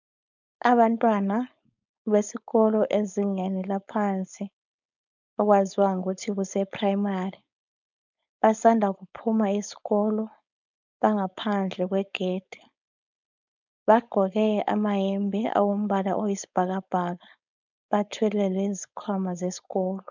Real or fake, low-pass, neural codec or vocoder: fake; 7.2 kHz; codec, 16 kHz, 4.8 kbps, FACodec